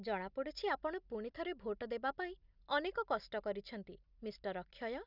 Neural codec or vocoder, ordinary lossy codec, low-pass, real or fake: none; none; 5.4 kHz; real